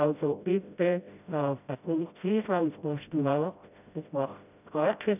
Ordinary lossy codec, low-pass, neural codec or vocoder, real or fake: none; 3.6 kHz; codec, 16 kHz, 0.5 kbps, FreqCodec, smaller model; fake